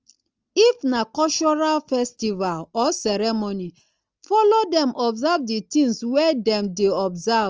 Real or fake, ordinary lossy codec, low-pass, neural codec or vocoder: real; Opus, 24 kbps; 7.2 kHz; none